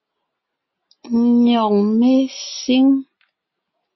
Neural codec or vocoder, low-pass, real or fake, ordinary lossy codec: none; 7.2 kHz; real; MP3, 24 kbps